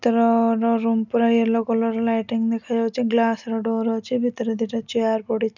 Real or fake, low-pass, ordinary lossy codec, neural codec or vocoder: real; 7.2 kHz; none; none